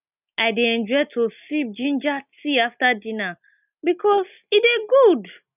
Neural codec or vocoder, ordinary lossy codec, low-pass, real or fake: none; none; 3.6 kHz; real